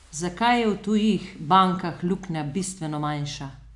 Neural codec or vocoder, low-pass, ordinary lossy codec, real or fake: none; 10.8 kHz; none; real